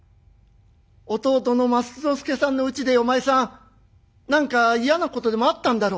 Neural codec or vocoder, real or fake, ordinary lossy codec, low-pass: none; real; none; none